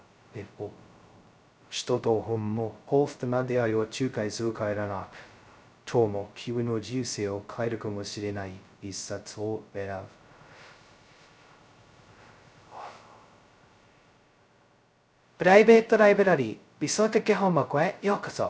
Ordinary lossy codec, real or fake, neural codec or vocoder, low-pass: none; fake; codec, 16 kHz, 0.2 kbps, FocalCodec; none